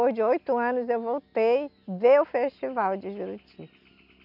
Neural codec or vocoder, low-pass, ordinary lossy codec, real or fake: none; 5.4 kHz; none; real